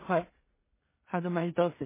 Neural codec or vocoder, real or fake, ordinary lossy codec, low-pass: codec, 16 kHz in and 24 kHz out, 0.4 kbps, LongCat-Audio-Codec, two codebook decoder; fake; MP3, 16 kbps; 3.6 kHz